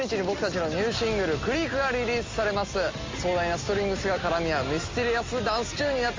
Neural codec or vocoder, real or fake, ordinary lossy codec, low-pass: none; real; Opus, 32 kbps; 7.2 kHz